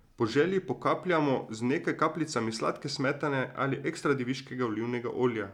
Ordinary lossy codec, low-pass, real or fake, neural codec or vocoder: none; 19.8 kHz; real; none